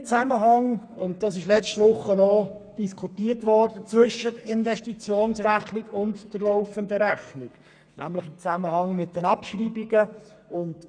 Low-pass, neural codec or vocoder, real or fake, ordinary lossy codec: 9.9 kHz; codec, 32 kHz, 1.9 kbps, SNAC; fake; MP3, 96 kbps